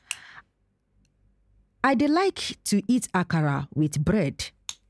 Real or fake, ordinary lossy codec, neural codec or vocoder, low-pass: real; none; none; none